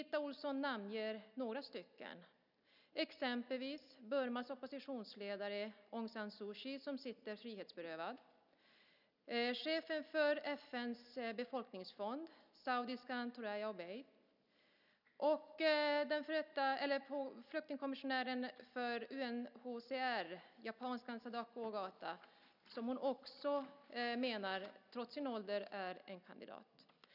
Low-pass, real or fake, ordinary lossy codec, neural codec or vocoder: 5.4 kHz; real; none; none